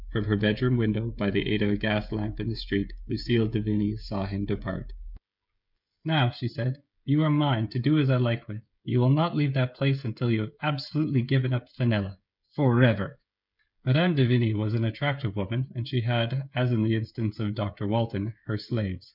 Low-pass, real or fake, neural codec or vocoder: 5.4 kHz; fake; codec, 16 kHz, 16 kbps, FreqCodec, smaller model